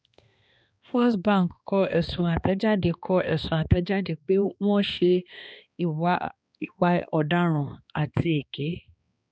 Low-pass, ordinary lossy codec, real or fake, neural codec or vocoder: none; none; fake; codec, 16 kHz, 2 kbps, X-Codec, HuBERT features, trained on balanced general audio